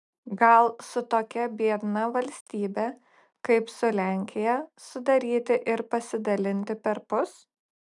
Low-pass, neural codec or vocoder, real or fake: 10.8 kHz; none; real